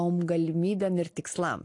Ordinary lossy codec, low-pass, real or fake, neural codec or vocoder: AAC, 48 kbps; 10.8 kHz; real; none